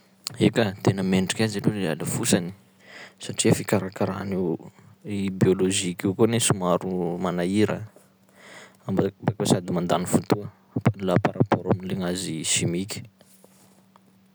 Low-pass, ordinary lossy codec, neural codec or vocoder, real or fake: none; none; none; real